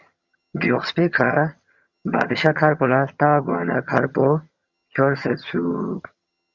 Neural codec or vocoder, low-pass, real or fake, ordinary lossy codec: vocoder, 22.05 kHz, 80 mel bands, HiFi-GAN; 7.2 kHz; fake; Opus, 64 kbps